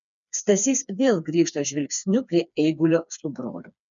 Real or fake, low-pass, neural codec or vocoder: fake; 7.2 kHz; codec, 16 kHz, 4 kbps, FreqCodec, smaller model